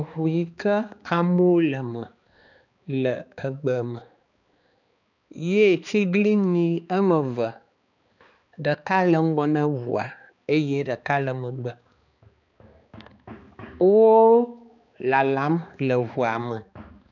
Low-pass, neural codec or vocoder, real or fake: 7.2 kHz; codec, 16 kHz, 2 kbps, X-Codec, HuBERT features, trained on balanced general audio; fake